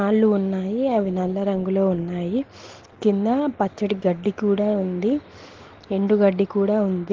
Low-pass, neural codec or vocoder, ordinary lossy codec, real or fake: 7.2 kHz; none; Opus, 16 kbps; real